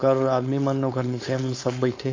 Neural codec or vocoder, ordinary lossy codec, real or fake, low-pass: codec, 16 kHz, 4.8 kbps, FACodec; MP3, 48 kbps; fake; 7.2 kHz